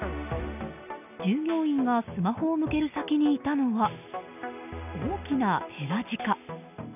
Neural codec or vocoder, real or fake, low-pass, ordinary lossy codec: none; real; 3.6 kHz; none